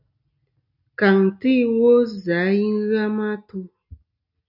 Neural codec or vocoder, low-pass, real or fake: none; 5.4 kHz; real